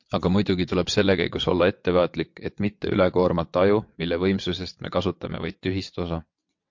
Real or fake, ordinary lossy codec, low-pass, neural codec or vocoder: fake; MP3, 48 kbps; 7.2 kHz; vocoder, 22.05 kHz, 80 mel bands, WaveNeXt